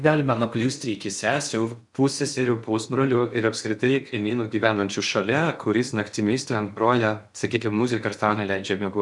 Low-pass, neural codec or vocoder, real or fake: 10.8 kHz; codec, 16 kHz in and 24 kHz out, 0.6 kbps, FocalCodec, streaming, 4096 codes; fake